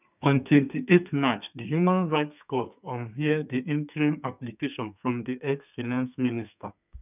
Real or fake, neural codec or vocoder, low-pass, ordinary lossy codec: fake; codec, 32 kHz, 1.9 kbps, SNAC; 3.6 kHz; none